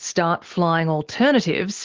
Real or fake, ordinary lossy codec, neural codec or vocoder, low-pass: real; Opus, 16 kbps; none; 7.2 kHz